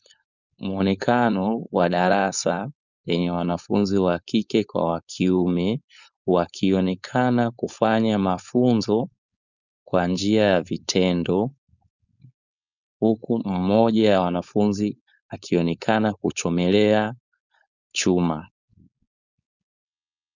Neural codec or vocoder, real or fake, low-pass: codec, 16 kHz, 4.8 kbps, FACodec; fake; 7.2 kHz